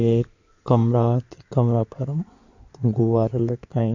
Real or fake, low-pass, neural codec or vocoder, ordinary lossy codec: fake; 7.2 kHz; codec, 16 kHz in and 24 kHz out, 2.2 kbps, FireRedTTS-2 codec; none